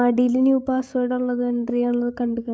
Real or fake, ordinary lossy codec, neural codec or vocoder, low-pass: fake; none; codec, 16 kHz, 16 kbps, FreqCodec, larger model; none